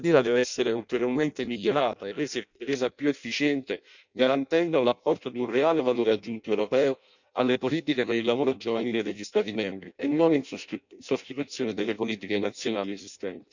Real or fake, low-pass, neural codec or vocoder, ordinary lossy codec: fake; 7.2 kHz; codec, 16 kHz in and 24 kHz out, 0.6 kbps, FireRedTTS-2 codec; none